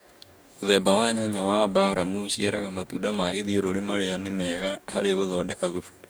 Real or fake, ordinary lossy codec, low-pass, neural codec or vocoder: fake; none; none; codec, 44.1 kHz, 2.6 kbps, DAC